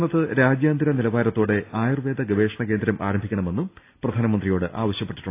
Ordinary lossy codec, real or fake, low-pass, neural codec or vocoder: AAC, 24 kbps; real; 3.6 kHz; none